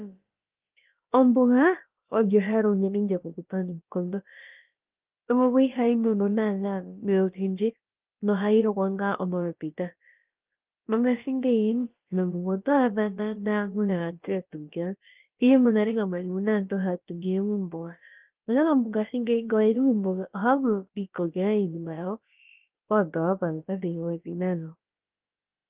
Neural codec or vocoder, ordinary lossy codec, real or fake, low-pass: codec, 16 kHz, about 1 kbps, DyCAST, with the encoder's durations; Opus, 32 kbps; fake; 3.6 kHz